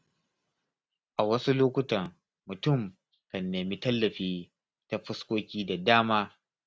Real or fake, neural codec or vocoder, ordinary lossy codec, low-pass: real; none; none; none